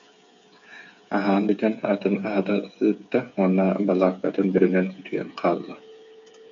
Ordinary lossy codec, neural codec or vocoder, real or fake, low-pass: AAC, 64 kbps; codec, 16 kHz, 8 kbps, FreqCodec, smaller model; fake; 7.2 kHz